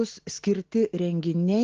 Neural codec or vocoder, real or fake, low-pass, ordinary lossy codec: none; real; 7.2 kHz; Opus, 16 kbps